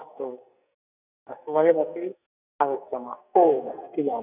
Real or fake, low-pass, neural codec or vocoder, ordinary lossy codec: fake; 3.6 kHz; codec, 44.1 kHz, 2.6 kbps, SNAC; none